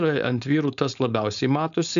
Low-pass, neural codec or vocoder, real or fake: 7.2 kHz; codec, 16 kHz, 4.8 kbps, FACodec; fake